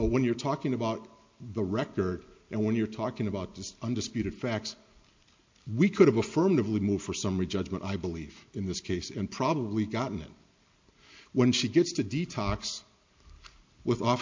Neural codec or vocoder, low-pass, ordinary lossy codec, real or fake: none; 7.2 kHz; MP3, 48 kbps; real